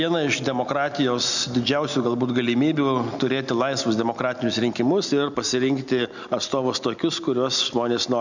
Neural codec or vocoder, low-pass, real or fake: none; 7.2 kHz; real